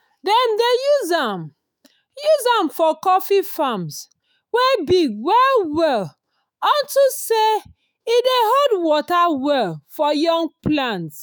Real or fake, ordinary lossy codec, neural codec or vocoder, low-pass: fake; none; autoencoder, 48 kHz, 128 numbers a frame, DAC-VAE, trained on Japanese speech; none